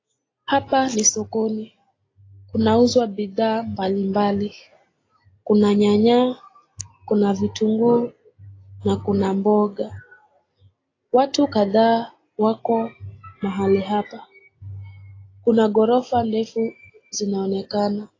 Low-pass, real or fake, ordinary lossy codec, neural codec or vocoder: 7.2 kHz; real; AAC, 32 kbps; none